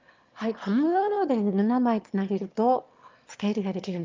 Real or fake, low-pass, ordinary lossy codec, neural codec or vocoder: fake; 7.2 kHz; Opus, 32 kbps; autoencoder, 22.05 kHz, a latent of 192 numbers a frame, VITS, trained on one speaker